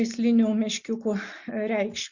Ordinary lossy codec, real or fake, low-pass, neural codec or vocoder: Opus, 64 kbps; real; 7.2 kHz; none